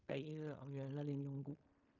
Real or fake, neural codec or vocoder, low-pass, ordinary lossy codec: fake; codec, 16 kHz in and 24 kHz out, 0.4 kbps, LongCat-Audio-Codec, fine tuned four codebook decoder; 7.2 kHz; none